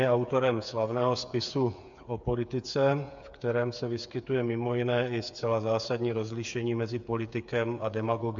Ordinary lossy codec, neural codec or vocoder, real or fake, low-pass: AAC, 64 kbps; codec, 16 kHz, 8 kbps, FreqCodec, smaller model; fake; 7.2 kHz